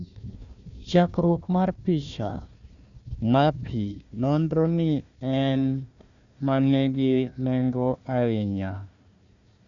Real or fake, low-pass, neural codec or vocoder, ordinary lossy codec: fake; 7.2 kHz; codec, 16 kHz, 1 kbps, FunCodec, trained on Chinese and English, 50 frames a second; none